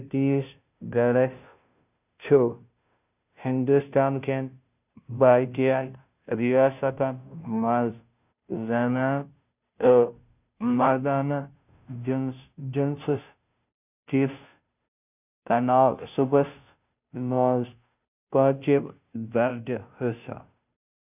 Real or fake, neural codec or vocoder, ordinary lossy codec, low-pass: fake; codec, 16 kHz, 0.5 kbps, FunCodec, trained on Chinese and English, 25 frames a second; none; 3.6 kHz